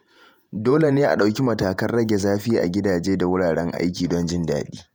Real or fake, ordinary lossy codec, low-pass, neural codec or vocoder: fake; none; none; vocoder, 48 kHz, 128 mel bands, Vocos